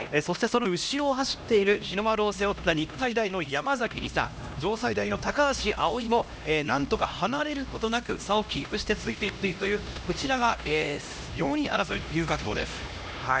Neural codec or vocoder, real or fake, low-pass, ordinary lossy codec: codec, 16 kHz, 1 kbps, X-Codec, HuBERT features, trained on LibriSpeech; fake; none; none